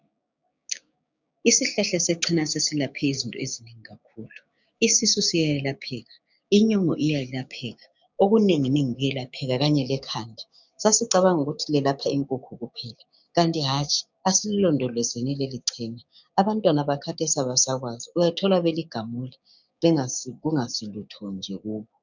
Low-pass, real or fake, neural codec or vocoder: 7.2 kHz; fake; codec, 16 kHz, 6 kbps, DAC